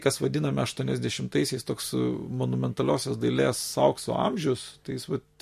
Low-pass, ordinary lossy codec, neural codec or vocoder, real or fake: 14.4 kHz; MP3, 64 kbps; none; real